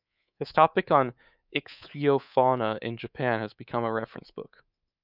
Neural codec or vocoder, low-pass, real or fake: codec, 24 kHz, 3.1 kbps, DualCodec; 5.4 kHz; fake